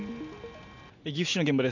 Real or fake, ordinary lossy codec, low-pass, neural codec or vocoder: real; none; 7.2 kHz; none